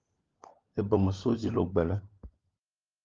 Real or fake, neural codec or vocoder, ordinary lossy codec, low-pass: fake; codec, 16 kHz, 16 kbps, FunCodec, trained on LibriTTS, 50 frames a second; Opus, 32 kbps; 7.2 kHz